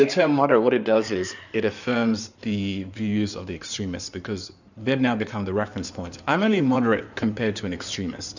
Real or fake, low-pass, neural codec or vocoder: fake; 7.2 kHz; codec, 16 kHz in and 24 kHz out, 2.2 kbps, FireRedTTS-2 codec